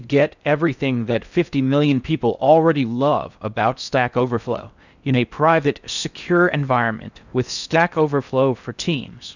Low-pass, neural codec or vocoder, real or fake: 7.2 kHz; codec, 16 kHz in and 24 kHz out, 0.6 kbps, FocalCodec, streaming, 4096 codes; fake